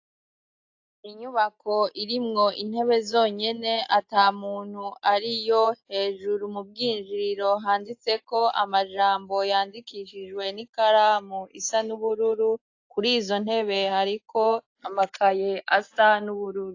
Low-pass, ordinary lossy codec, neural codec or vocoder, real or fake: 7.2 kHz; AAC, 48 kbps; none; real